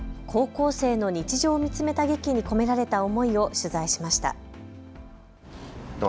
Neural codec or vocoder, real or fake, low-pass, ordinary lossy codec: none; real; none; none